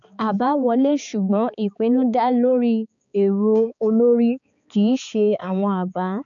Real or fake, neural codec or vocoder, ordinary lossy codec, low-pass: fake; codec, 16 kHz, 4 kbps, X-Codec, HuBERT features, trained on balanced general audio; none; 7.2 kHz